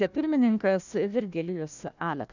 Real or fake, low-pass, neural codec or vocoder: fake; 7.2 kHz; codec, 16 kHz, 1 kbps, FunCodec, trained on Chinese and English, 50 frames a second